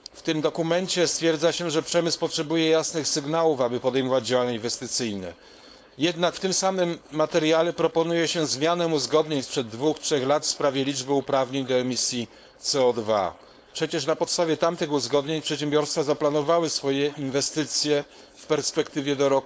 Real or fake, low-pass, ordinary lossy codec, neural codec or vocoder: fake; none; none; codec, 16 kHz, 4.8 kbps, FACodec